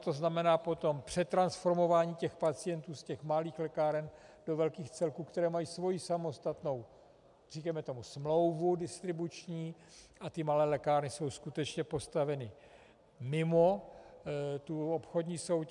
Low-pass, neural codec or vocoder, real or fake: 10.8 kHz; none; real